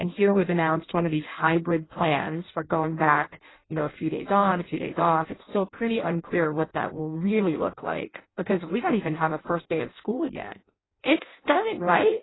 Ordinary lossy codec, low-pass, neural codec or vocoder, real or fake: AAC, 16 kbps; 7.2 kHz; codec, 16 kHz in and 24 kHz out, 0.6 kbps, FireRedTTS-2 codec; fake